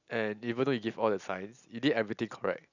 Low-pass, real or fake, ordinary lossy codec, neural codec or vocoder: 7.2 kHz; real; none; none